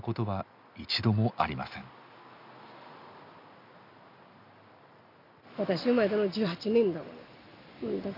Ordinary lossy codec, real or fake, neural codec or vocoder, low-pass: none; real; none; 5.4 kHz